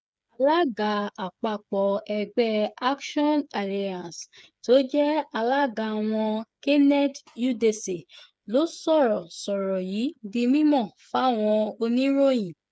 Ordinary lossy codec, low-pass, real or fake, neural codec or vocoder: none; none; fake; codec, 16 kHz, 8 kbps, FreqCodec, smaller model